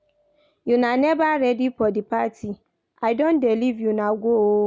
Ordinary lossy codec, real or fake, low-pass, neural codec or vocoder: none; real; none; none